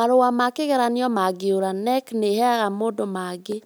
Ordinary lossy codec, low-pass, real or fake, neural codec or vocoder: none; none; real; none